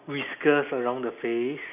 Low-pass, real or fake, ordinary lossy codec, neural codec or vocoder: 3.6 kHz; real; none; none